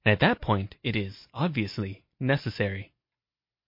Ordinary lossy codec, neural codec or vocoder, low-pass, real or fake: MP3, 32 kbps; none; 5.4 kHz; real